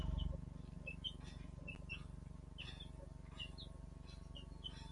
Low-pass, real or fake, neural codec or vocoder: 10.8 kHz; real; none